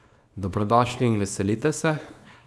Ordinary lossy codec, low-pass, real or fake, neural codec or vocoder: none; none; fake; codec, 24 kHz, 0.9 kbps, WavTokenizer, small release